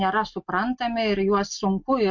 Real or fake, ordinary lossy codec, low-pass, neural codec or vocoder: real; MP3, 48 kbps; 7.2 kHz; none